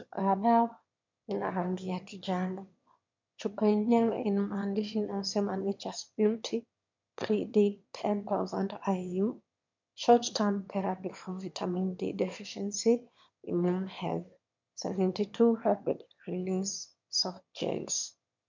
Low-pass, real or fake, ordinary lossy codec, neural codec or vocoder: 7.2 kHz; fake; MP3, 64 kbps; autoencoder, 22.05 kHz, a latent of 192 numbers a frame, VITS, trained on one speaker